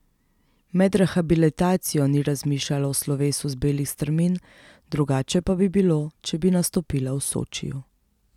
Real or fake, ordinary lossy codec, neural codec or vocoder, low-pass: real; none; none; 19.8 kHz